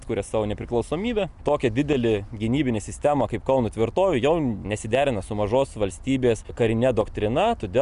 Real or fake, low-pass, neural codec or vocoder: real; 10.8 kHz; none